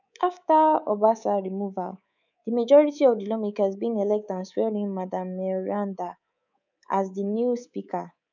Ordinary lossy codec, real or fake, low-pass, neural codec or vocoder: none; fake; 7.2 kHz; codec, 24 kHz, 3.1 kbps, DualCodec